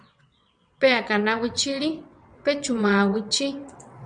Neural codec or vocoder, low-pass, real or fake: vocoder, 22.05 kHz, 80 mel bands, WaveNeXt; 9.9 kHz; fake